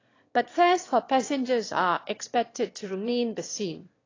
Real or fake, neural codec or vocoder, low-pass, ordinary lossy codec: fake; autoencoder, 22.05 kHz, a latent of 192 numbers a frame, VITS, trained on one speaker; 7.2 kHz; AAC, 32 kbps